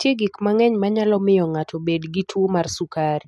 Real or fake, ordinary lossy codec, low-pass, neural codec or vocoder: real; none; none; none